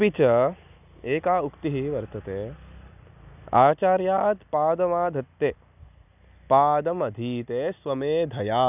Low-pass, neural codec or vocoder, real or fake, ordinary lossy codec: 3.6 kHz; none; real; none